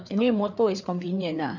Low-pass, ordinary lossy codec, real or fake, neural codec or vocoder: 7.2 kHz; none; fake; codec, 16 kHz, 4 kbps, FreqCodec, larger model